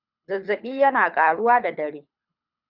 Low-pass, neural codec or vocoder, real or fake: 5.4 kHz; codec, 24 kHz, 6 kbps, HILCodec; fake